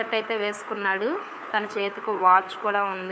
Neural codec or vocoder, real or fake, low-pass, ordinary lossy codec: codec, 16 kHz, 4 kbps, FunCodec, trained on LibriTTS, 50 frames a second; fake; none; none